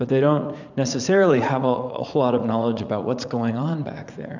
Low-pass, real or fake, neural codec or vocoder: 7.2 kHz; fake; vocoder, 44.1 kHz, 80 mel bands, Vocos